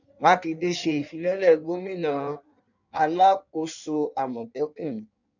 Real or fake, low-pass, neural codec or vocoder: fake; 7.2 kHz; codec, 16 kHz in and 24 kHz out, 1.1 kbps, FireRedTTS-2 codec